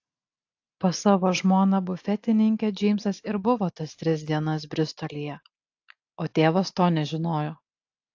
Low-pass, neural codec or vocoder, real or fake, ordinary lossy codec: 7.2 kHz; none; real; AAC, 48 kbps